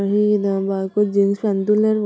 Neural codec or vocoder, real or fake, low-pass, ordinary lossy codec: none; real; none; none